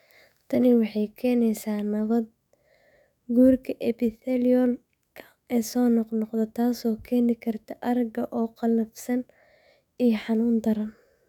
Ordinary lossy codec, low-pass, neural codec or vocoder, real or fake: none; 19.8 kHz; autoencoder, 48 kHz, 128 numbers a frame, DAC-VAE, trained on Japanese speech; fake